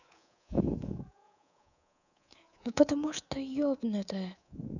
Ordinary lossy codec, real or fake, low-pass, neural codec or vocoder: none; fake; 7.2 kHz; codec, 24 kHz, 3.1 kbps, DualCodec